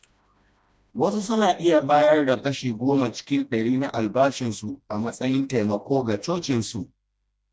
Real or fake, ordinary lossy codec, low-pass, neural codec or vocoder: fake; none; none; codec, 16 kHz, 1 kbps, FreqCodec, smaller model